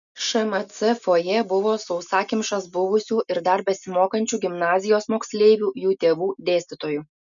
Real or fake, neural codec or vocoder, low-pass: real; none; 7.2 kHz